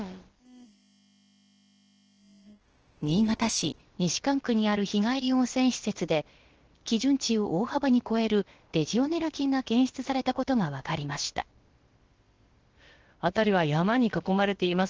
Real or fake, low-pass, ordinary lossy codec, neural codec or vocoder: fake; 7.2 kHz; Opus, 16 kbps; codec, 16 kHz, about 1 kbps, DyCAST, with the encoder's durations